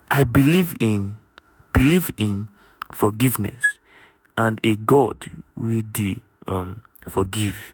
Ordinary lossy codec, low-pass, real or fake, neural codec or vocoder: none; none; fake; autoencoder, 48 kHz, 32 numbers a frame, DAC-VAE, trained on Japanese speech